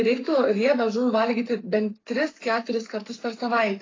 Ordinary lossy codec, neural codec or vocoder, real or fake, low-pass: AAC, 32 kbps; codec, 44.1 kHz, 7.8 kbps, Pupu-Codec; fake; 7.2 kHz